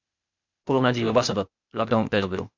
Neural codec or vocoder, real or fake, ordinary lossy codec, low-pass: codec, 16 kHz, 0.8 kbps, ZipCodec; fake; MP3, 64 kbps; 7.2 kHz